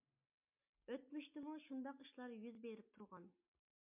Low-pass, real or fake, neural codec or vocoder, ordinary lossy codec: 3.6 kHz; real; none; MP3, 32 kbps